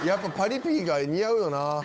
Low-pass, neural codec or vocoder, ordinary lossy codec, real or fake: none; codec, 16 kHz, 8 kbps, FunCodec, trained on Chinese and English, 25 frames a second; none; fake